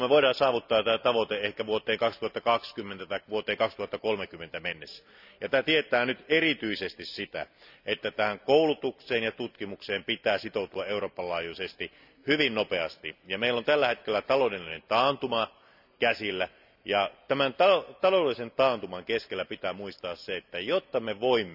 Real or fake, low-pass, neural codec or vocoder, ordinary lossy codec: real; 5.4 kHz; none; none